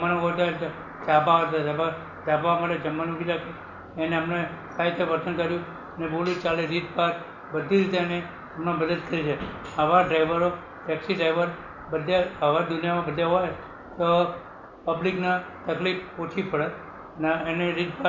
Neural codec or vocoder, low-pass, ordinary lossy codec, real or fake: none; 7.2 kHz; Opus, 64 kbps; real